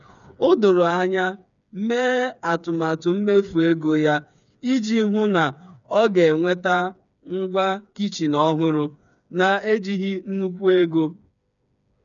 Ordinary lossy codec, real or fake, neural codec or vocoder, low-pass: none; fake; codec, 16 kHz, 4 kbps, FreqCodec, smaller model; 7.2 kHz